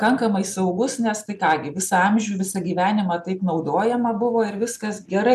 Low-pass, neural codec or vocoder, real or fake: 14.4 kHz; none; real